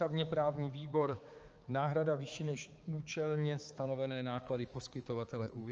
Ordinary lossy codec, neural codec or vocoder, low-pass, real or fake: Opus, 24 kbps; codec, 16 kHz, 4 kbps, X-Codec, HuBERT features, trained on balanced general audio; 7.2 kHz; fake